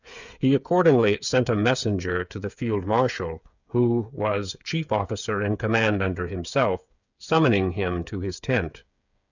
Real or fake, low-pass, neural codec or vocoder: fake; 7.2 kHz; codec, 16 kHz, 8 kbps, FreqCodec, smaller model